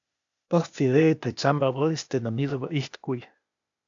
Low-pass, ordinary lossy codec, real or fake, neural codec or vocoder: 7.2 kHz; AAC, 64 kbps; fake; codec, 16 kHz, 0.8 kbps, ZipCodec